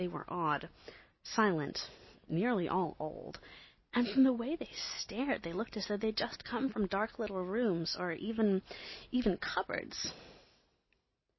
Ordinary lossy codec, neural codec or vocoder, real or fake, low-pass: MP3, 24 kbps; none; real; 7.2 kHz